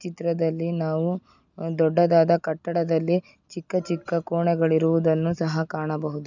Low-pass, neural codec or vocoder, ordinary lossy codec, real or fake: 7.2 kHz; none; none; real